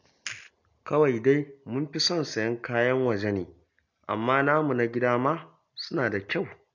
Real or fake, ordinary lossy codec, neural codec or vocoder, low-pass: real; MP3, 64 kbps; none; 7.2 kHz